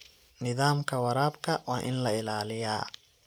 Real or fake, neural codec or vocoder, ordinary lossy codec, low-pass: real; none; none; none